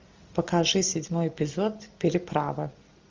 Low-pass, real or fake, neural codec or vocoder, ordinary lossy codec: 7.2 kHz; real; none; Opus, 32 kbps